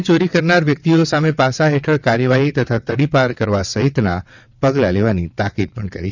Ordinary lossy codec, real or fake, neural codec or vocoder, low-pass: none; fake; vocoder, 22.05 kHz, 80 mel bands, WaveNeXt; 7.2 kHz